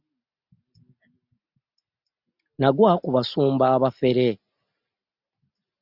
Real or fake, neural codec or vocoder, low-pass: real; none; 5.4 kHz